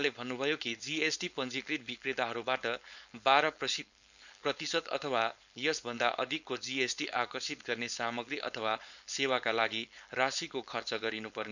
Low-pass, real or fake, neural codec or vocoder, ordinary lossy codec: 7.2 kHz; fake; codec, 16 kHz, 4.8 kbps, FACodec; none